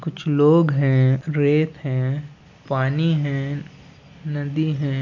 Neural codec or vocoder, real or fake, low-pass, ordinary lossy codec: none; real; 7.2 kHz; none